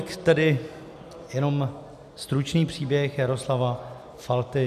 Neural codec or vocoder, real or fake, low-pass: none; real; 14.4 kHz